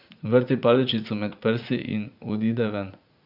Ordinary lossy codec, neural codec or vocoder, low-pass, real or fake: none; vocoder, 44.1 kHz, 80 mel bands, Vocos; 5.4 kHz; fake